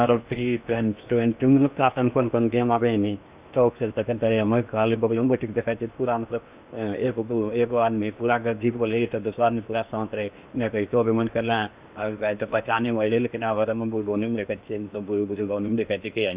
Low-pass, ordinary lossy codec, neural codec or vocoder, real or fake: 3.6 kHz; Opus, 64 kbps; codec, 16 kHz in and 24 kHz out, 0.8 kbps, FocalCodec, streaming, 65536 codes; fake